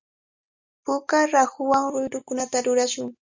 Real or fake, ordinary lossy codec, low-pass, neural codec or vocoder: real; MP3, 64 kbps; 7.2 kHz; none